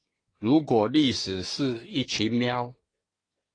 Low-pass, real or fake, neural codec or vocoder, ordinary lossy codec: 9.9 kHz; fake; codec, 24 kHz, 1 kbps, SNAC; AAC, 32 kbps